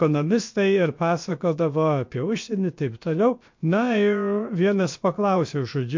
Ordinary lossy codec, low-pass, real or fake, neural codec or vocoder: MP3, 48 kbps; 7.2 kHz; fake; codec, 16 kHz, about 1 kbps, DyCAST, with the encoder's durations